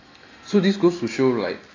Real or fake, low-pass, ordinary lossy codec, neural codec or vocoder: real; 7.2 kHz; AAC, 32 kbps; none